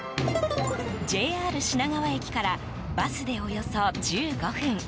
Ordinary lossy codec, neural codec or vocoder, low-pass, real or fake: none; none; none; real